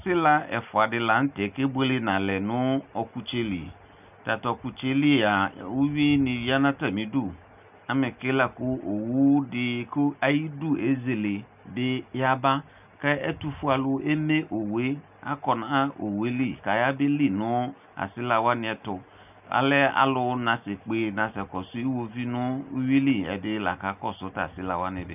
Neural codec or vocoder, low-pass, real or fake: none; 3.6 kHz; real